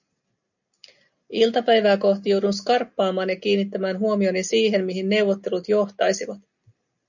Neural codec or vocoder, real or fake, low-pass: none; real; 7.2 kHz